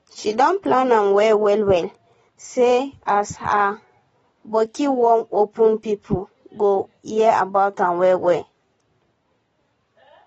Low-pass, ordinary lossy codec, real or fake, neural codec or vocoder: 14.4 kHz; AAC, 24 kbps; real; none